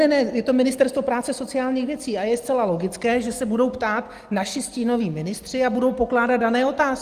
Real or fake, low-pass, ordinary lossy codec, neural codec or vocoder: real; 14.4 kHz; Opus, 24 kbps; none